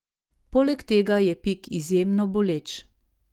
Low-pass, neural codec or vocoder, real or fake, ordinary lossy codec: 19.8 kHz; codec, 44.1 kHz, 7.8 kbps, DAC; fake; Opus, 24 kbps